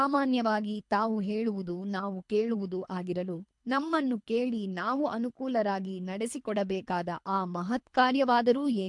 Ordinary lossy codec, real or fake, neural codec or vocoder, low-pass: MP3, 64 kbps; fake; codec, 24 kHz, 3 kbps, HILCodec; 10.8 kHz